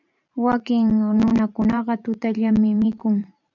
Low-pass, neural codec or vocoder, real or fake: 7.2 kHz; vocoder, 44.1 kHz, 128 mel bands every 512 samples, BigVGAN v2; fake